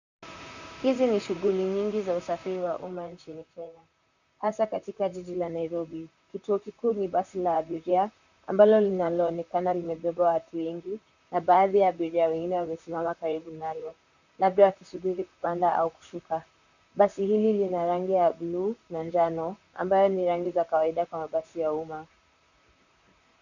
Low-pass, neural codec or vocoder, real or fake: 7.2 kHz; vocoder, 44.1 kHz, 128 mel bands, Pupu-Vocoder; fake